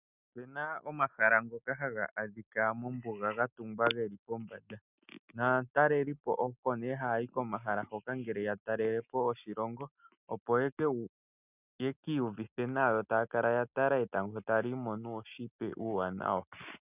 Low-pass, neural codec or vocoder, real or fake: 3.6 kHz; none; real